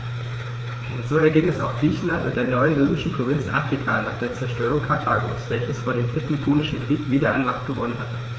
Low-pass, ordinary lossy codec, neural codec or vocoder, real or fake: none; none; codec, 16 kHz, 4 kbps, FreqCodec, larger model; fake